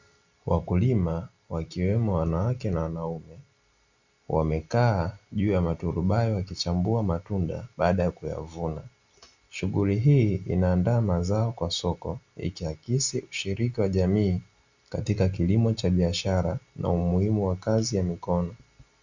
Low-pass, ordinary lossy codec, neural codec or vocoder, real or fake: 7.2 kHz; Opus, 64 kbps; none; real